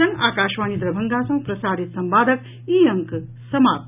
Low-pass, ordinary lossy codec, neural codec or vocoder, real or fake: 3.6 kHz; none; none; real